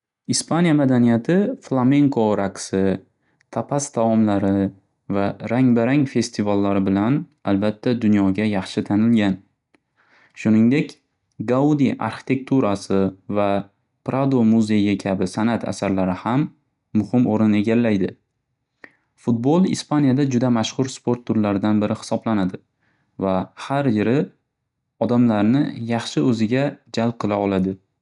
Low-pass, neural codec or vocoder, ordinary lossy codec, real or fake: 10.8 kHz; none; none; real